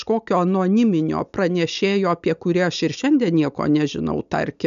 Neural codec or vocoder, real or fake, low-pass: none; real; 7.2 kHz